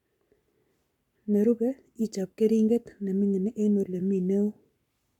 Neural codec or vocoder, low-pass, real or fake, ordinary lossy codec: codec, 44.1 kHz, 7.8 kbps, Pupu-Codec; 19.8 kHz; fake; none